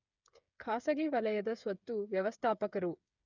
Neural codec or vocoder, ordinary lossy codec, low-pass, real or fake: codec, 16 kHz, 8 kbps, FreqCodec, smaller model; none; 7.2 kHz; fake